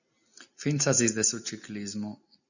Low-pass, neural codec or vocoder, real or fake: 7.2 kHz; none; real